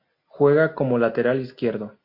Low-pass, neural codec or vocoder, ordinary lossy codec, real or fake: 5.4 kHz; none; MP3, 32 kbps; real